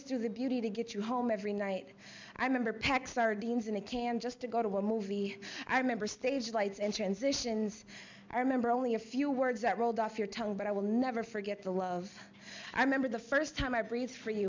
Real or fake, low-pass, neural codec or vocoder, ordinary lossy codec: real; 7.2 kHz; none; MP3, 64 kbps